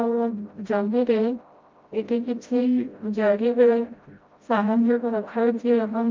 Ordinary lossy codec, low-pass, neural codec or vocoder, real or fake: Opus, 24 kbps; 7.2 kHz; codec, 16 kHz, 0.5 kbps, FreqCodec, smaller model; fake